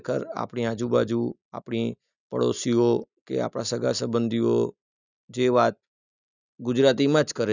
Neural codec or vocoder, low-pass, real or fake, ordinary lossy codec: none; 7.2 kHz; real; none